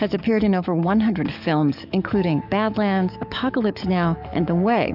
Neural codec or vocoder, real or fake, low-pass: codec, 44.1 kHz, 7.8 kbps, DAC; fake; 5.4 kHz